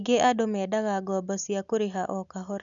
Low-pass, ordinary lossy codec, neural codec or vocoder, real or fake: 7.2 kHz; none; none; real